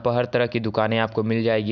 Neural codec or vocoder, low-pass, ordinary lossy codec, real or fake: none; 7.2 kHz; none; real